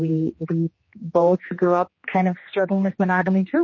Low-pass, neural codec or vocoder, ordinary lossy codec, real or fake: 7.2 kHz; codec, 16 kHz, 2 kbps, X-Codec, HuBERT features, trained on general audio; MP3, 32 kbps; fake